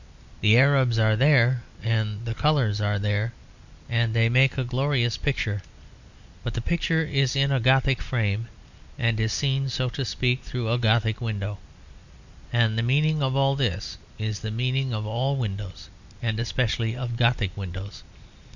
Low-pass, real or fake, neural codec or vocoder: 7.2 kHz; real; none